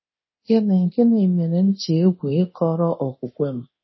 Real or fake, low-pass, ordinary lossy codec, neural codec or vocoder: fake; 7.2 kHz; MP3, 24 kbps; codec, 24 kHz, 0.9 kbps, DualCodec